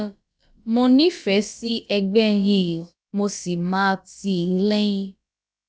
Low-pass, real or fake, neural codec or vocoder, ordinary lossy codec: none; fake; codec, 16 kHz, about 1 kbps, DyCAST, with the encoder's durations; none